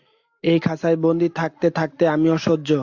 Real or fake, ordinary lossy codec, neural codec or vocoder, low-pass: real; AAC, 48 kbps; none; 7.2 kHz